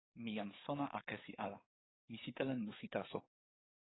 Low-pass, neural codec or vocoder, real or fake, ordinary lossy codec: 7.2 kHz; codec, 44.1 kHz, 7.8 kbps, DAC; fake; AAC, 16 kbps